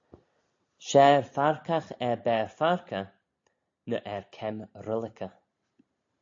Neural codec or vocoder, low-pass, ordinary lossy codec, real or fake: none; 7.2 kHz; AAC, 48 kbps; real